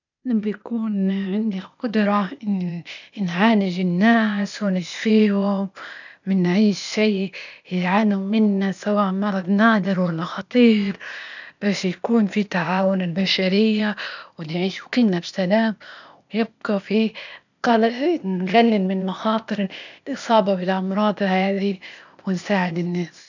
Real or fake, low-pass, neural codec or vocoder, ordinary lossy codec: fake; 7.2 kHz; codec, 16 kHz, 0.8 kbps, ZipCodec; none